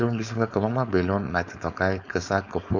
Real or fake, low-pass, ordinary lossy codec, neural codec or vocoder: fake; 7.2 kHz; none; codec, 16 kHz, 4.8 kbps, FACodec